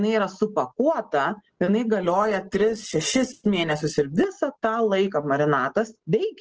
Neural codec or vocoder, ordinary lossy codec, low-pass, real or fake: none; Opus, 16 kbps; 7.2 kHz; real